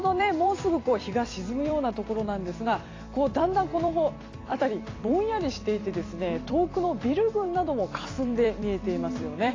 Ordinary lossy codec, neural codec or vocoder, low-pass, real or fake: AAC, 32 kbps; vocoder, 44.1 kHz, 128 mel bands every 256 samples, BigVGAN v2; 7.2 kHz; fake